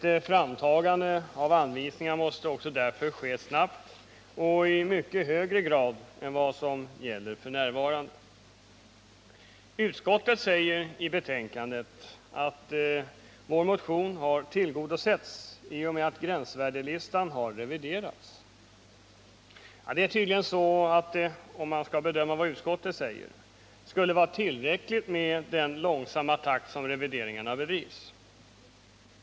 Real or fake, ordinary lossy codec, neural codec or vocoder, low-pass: real; none; none; none